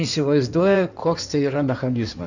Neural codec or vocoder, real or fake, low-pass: codec, 16 kHz in and 24 kHz out, 2.2 kbps, FireRedTTS-2 codec; fake; 7.2 kHz